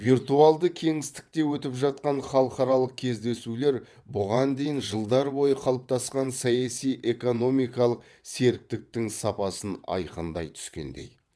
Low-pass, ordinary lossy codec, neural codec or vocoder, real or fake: none; none; vocoder, 22.05 kHz, 80 mel bands, WaveNeXt; fake